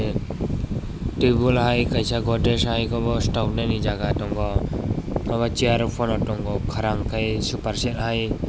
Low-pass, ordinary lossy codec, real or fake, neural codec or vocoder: none; none; real; none